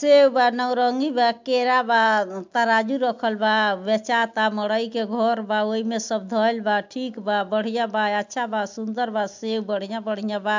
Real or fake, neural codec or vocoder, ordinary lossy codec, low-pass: real; none; MP3, 64 kbps; 7.2 kHz